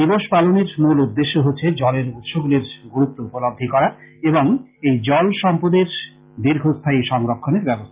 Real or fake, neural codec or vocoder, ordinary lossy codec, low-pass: real; none; Opus, 32 kbps; 3.6 kHz